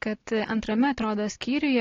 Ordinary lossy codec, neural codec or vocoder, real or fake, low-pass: AAC, 32 kbps; none; real; 7.2 kHz